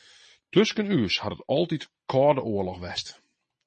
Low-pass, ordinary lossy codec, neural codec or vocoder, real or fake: 9.9 kHz; MP3, 32 kbps; none; real